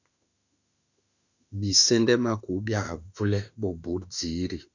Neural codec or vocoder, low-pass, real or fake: autoencoder, 48 kHz, 32 numbers a frame, DAC-VAE, trained on Japanese speech; 7.2 kHz; fake